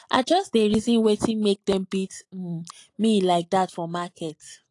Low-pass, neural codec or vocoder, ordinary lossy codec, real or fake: 10.8 kHz; none; AAC, 48 kbps; real